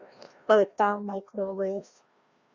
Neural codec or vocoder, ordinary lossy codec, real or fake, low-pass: codec, 16 kHz, 1 kbps, FreqCodec, larger model; Opus, 64 kbps; fake; 7.2 kHz